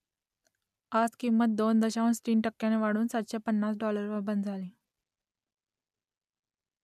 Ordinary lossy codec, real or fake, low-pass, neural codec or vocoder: none; real; 14.4 kHz; none